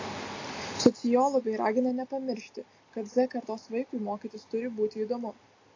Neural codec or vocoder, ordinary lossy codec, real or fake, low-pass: none; AAC, 32 kbps; real; 7.2 kHz